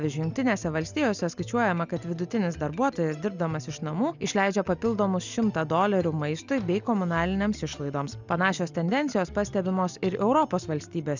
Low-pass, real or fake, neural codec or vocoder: 7.2 kHz; real; none